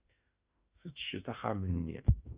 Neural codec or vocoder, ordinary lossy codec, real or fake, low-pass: autoencoder, 48 kHz, 32 numbers a frame, DAC-VAE, trained on Japanese speech; Opus, 64 kbps; fake; 3.6 kHz